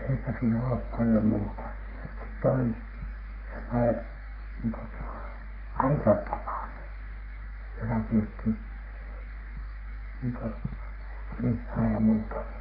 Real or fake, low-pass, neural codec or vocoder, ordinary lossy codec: fake; 5.4 kHz; codec, 44.1 kHz, 3.4 kbps, Pupu-Codec; AAC, 48 kbps